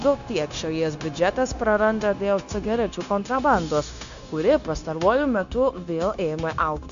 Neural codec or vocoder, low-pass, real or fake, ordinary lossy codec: codec, 16 kHz, 0.9 kbps, LongCat-Audio-Codec; 7.2 kHz; fake; AAC, 96 kbps